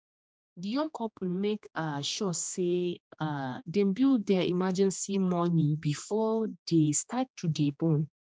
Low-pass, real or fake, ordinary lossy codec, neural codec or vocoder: none; fake; none; codec, 16 kHz, 2 kbps, X-Codec, HuBERT features, trained on general audio